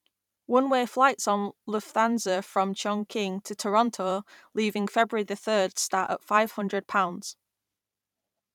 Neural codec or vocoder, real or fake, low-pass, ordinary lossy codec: vocoder, 44.1 kHz, 128 mel bands every 512 samples, BigVGAN v2; fake; 19.8 kHz; none